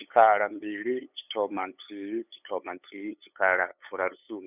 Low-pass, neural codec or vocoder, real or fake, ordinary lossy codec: 3.6 kHz; codec, 16 kHz, 8 kbps, FunCodec, trained on LibriTTS, 25 frames a second; fake; none